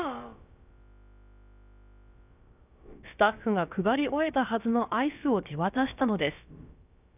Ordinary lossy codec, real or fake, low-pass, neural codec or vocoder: none; fake; 3.6 kHz; codec, 16 kHz, about 1 kbps, DyCAST, with the encoder's durations